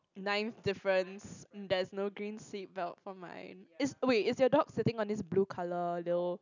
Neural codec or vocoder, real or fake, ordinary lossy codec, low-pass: none; real; none; 7.2 kHz